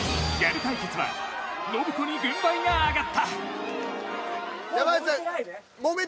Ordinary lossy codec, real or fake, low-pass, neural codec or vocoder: none; real; none; none